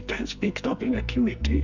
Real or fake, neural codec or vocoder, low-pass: fake; codec, 44.1 kHz, 2.6 kbps, SNAC; 7.2 kHz